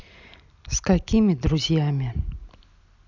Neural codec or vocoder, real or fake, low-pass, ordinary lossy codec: none; real; 7.2 kHz; none